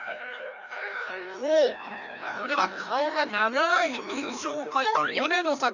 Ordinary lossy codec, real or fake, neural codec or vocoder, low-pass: none; fake; codec, 16 kHz, 1 kbps, FreqCodec, larger model; 7.2 kHz